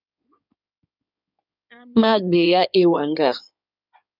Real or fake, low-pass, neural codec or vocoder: fake; 5.4 kHz; codec, 16 kHz in and 24 kHz out, 2.2 kbps, FireRedTTS-2 codec